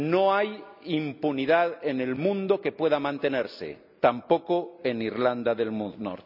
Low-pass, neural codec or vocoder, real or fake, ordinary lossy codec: 5.4 kHz; none; real; none